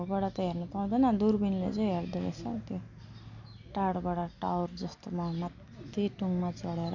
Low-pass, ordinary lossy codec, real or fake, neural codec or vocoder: 7.2 kHz; none; real; none